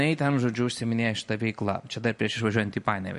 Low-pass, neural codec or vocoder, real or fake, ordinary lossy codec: 14.4 kHz; none; real; MP3, 48 kbps